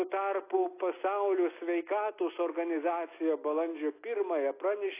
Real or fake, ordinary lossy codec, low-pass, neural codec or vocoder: real; AAC, 24 kbps; 3.6 kHz; none